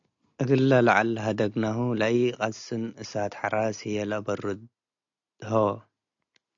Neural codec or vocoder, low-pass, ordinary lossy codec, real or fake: none; 7.2 kHz; AAC, 64 kbps; real